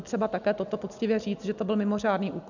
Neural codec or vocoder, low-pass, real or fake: none; 7.2 kHz; real